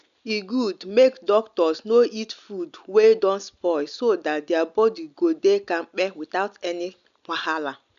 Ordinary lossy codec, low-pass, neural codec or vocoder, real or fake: none; 7.2 kHz; none; real